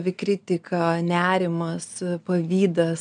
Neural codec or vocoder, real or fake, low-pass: none; real; 9.9 kHz